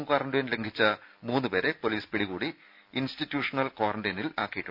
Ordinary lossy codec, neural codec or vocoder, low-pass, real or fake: none; none; 5.4 kHz; real